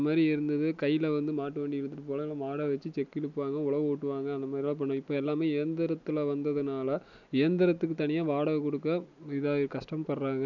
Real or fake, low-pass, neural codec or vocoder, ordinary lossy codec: real; 7.2 kHz; none; none